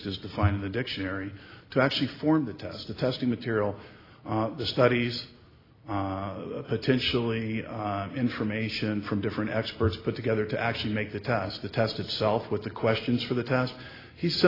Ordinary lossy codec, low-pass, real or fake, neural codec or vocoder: AAC, 24 kbps; 5.4 kHz; real; none